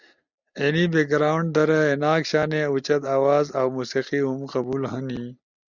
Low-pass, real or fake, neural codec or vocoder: 7.2 kHz; real; none